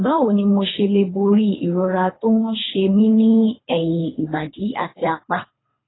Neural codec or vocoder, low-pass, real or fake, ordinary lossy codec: codec, 24 kHz, 3 kbps, HILCodec; 7.2 kHz; fake; AAC, 16 kbps